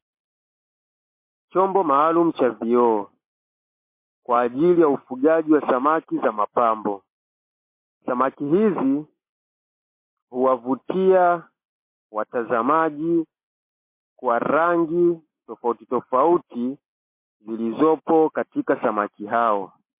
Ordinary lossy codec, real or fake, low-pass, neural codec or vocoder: MP3, 24 kbps; real; 3.6 kHz; none